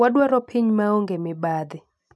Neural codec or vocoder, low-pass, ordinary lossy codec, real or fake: none; none; none; real